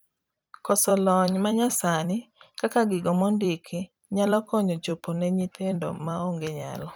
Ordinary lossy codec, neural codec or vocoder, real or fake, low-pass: none; vocoder, 44.1 kHz, 128 mel bands every 256 samples, BigVGAN v2; fake; none